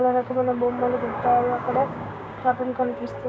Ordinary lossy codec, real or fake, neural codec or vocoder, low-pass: none; fake; codec, 16 kHz, 6 kbps, DAC; none